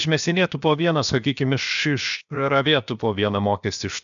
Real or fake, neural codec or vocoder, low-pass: fake; codec, 16 kHz, 0.7 kbps, FocalCodec; 7.2 kHz